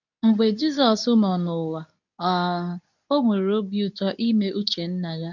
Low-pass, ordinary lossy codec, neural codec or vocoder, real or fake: 7.2 kHz; none; codec, 24 kHz, 0.9 kbps, WavTokenizer, medium speech release version 2; fake